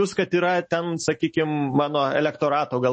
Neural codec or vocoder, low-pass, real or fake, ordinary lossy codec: autoencoder, 48 kHz, 128 numbers a frame, DAC-VAE, trained on Japanese speech; 10.8 kHz; fake; MP3, 32 kbps